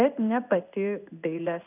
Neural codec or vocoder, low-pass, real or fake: codec, 16 kHz in and 24 kHz out, 1 kbps, XY-Tokenizer; 3.6 kHz; fake